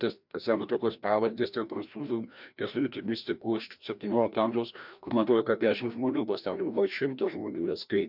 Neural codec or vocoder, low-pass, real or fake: codec, 16 kHz, 1 kbps, FreqCodec, larger model; 5.4 kHz; fake